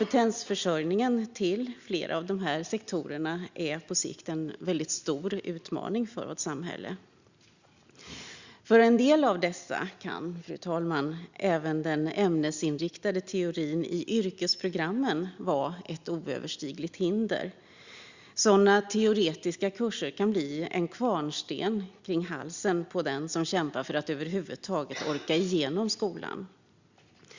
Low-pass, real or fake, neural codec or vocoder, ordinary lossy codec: 7.2 kHz; real; none; Opus, 64 kbps